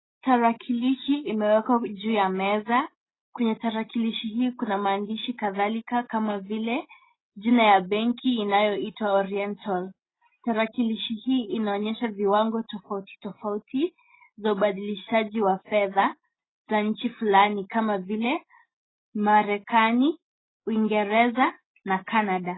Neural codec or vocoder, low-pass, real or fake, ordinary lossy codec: none; 7.2 kHz; real; AAC, 16 kbps